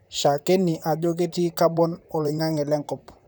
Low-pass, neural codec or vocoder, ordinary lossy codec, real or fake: none; vocoder, 44.1 kHz, 128 mel bands, Pupu-Vocoder; none; fake